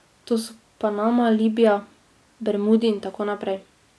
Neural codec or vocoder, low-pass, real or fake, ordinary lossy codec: none; none; real; none